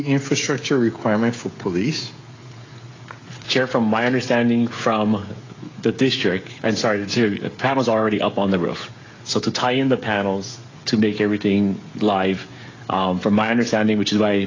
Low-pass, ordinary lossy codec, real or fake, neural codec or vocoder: 7.2 kHz; AAC, 32 kbps; fake; codec, 16 kHz, 16 kbps, FreqCodec, smaller model